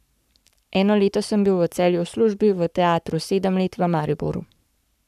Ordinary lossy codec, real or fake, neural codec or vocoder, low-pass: none; fake; codec, 44.1 kHz, 7.8 kbps, Pupu-Codec; 14.4 kHz